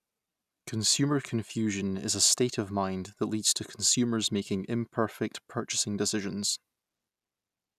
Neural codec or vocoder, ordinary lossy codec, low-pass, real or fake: none; none; 14.4 kHz; real